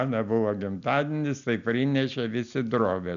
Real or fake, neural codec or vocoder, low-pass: real; none; 7.2 kHz